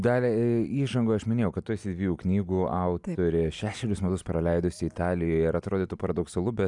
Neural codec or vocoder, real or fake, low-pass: none; real; 10.8 kHz